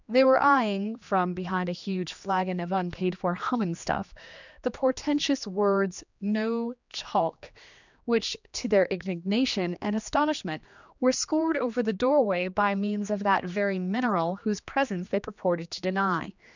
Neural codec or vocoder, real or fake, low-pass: codec, 16 kHz, 2 kbps, X-Codec, HuBERT features, trained on general audio; fake; 7.2 kHz